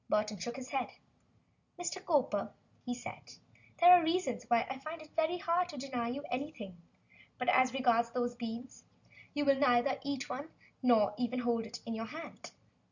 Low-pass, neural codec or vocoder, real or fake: 7.2 kHz; none; real